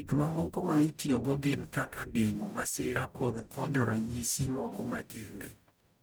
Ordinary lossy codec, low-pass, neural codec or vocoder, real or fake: none; none; codec, 44.1 kHz, 0.9 kbps, DAC; fake